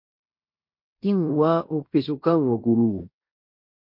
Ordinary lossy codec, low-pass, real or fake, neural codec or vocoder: MP3, 32 kbps; 5.4 kHz; fake; codec, 16 kHz in and 24 kHz out, 0.9 kbps, LongCat-Audio-Codec, fine tuned four codebook decoder